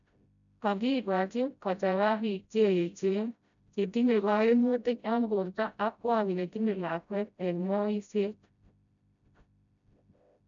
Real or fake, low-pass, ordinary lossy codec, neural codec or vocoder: fake; 7.2 kHz; none; codec, 16 kHz, 0.5 kbps, FreqCodec, smaller model